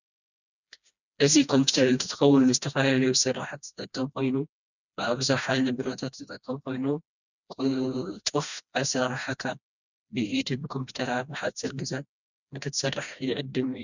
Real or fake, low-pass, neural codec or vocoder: fake; 7.2 kHz; codec, 16 kHz, 1 kbps, FreqCodec, smaller model